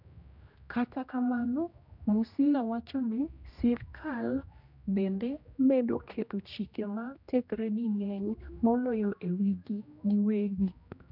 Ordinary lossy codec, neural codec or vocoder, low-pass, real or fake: none; codec, 16 kHz, 1 kbps, X-Codec, HuBERT features, trained on general audio; 5.4 kHz; fake